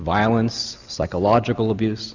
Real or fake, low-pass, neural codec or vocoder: real; 7.2 kHz; none